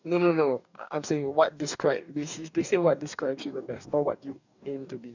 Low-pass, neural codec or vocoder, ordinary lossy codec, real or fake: 7.2 kHz; codec, 44.1 kHz, 2.6 kbps, DAC; none; fake